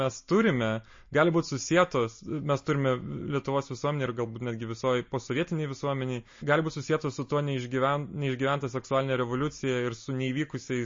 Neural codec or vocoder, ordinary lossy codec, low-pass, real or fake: none; MP3, 32 kbps; 7.2 kHz; real